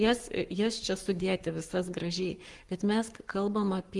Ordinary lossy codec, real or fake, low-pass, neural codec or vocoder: Opus, 24 kbps; fake; 10.8 kHz; codec, 44.1 kHz, 7.8 kbps, Pupu-Codec